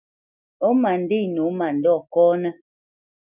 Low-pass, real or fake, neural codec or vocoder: 3.6 kHz; real; none